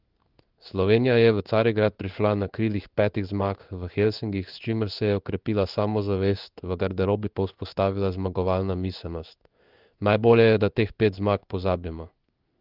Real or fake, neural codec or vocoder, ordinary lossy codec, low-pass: fake; codec, 16 kHz in and 24 kHz out, 1 kbps, XY-Tokenizer; Opus, 32 kbps; 5.4 kHz